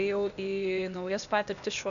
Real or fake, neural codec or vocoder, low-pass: fake; codec, 16 kHz, 0.8 kbps, ZipCodec; 7.2 kHz